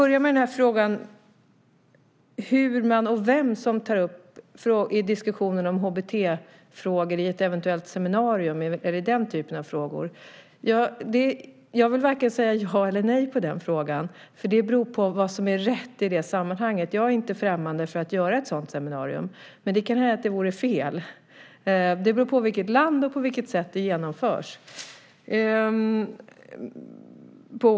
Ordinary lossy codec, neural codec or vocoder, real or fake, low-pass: none; none; real; none